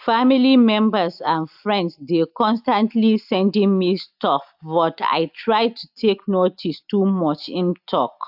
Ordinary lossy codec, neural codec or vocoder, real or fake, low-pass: AAC, 48 kbps; none; real; 5.4 kHz